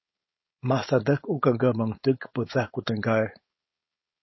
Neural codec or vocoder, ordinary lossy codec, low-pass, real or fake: codec, 16 kHz, 4.8 kbps, FACodec; MP3, 24 kbps; 7.2 kHz; fake